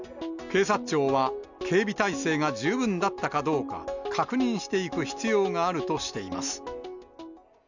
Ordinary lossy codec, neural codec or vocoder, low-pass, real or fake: none; none; 7.2 kHz; real